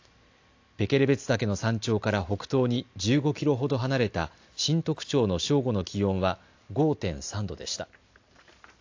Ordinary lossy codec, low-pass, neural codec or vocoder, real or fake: AAC, 48 kbps; 7.2 kHz; none; real